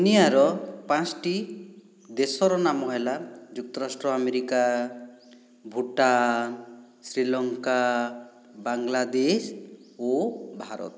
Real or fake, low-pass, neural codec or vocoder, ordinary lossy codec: real; none; none; none